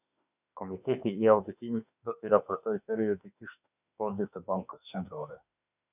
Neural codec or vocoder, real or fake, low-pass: autoencoder, 48 kHz, 32 numbers a frame, DAC-VAE, trained on Japanese speech; fake; 3.6 kHz